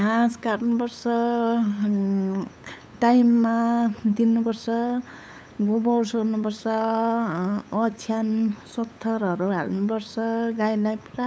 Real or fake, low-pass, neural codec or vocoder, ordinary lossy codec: fake; none; codec, 16 kHz, 8 kbps, FunCodec, trained on LibriTTS, 25 frames a second; none